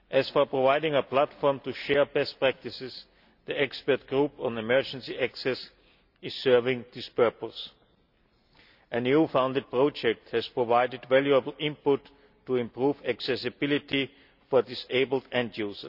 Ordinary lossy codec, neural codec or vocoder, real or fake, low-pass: none; none; real; 5.4 kHz